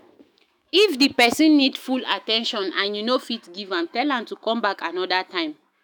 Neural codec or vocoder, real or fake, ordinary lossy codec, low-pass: autoencoder, 48 kHz, 128 numbers a frame, DAC-VAE, trained on Japanese speech; fake; none; none